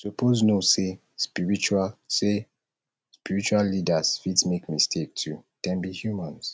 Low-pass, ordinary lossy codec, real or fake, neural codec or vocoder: none; none; real; none